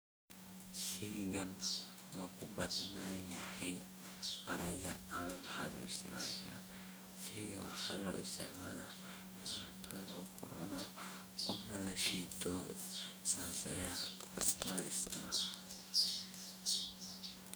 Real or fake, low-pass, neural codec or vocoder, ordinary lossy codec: fake; none; codec, 44.1 kHz, 2.6 kbps, DAC; none